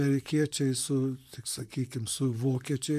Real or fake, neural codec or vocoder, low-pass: fake; vocoder, 44.1 kHz, 128 mel bands, Pupu-Vocoder; 14.4 kHz